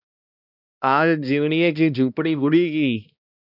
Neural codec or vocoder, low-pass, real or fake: codec, 16 kHz, 1 kbps, X-Codec, HuBERT features, trained on balanced general audio; 5.4 kHz; fake